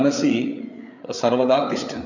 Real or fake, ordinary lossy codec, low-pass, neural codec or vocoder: fake; none; 7.2 kHz; codec, 16 kHz, 8 kbps, FreqCodec, larger model